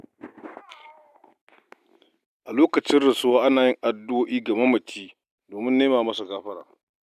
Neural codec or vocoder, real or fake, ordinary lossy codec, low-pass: none; real; none; 14.4 kHz